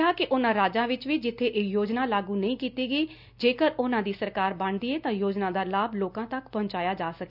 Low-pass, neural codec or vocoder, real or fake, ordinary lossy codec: 5.4 kHz; none; real; none